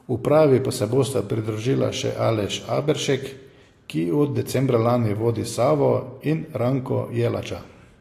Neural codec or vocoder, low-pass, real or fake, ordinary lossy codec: none; 14.4 kHz; real; AAC, 48 kbps